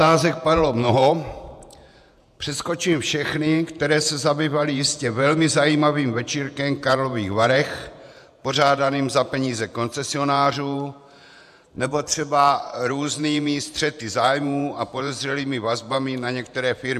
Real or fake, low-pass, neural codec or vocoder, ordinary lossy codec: fake; 14.4 kHz; vocoder, 48 kHz, 128 mel bands, Vocos; AAC, 96 kbps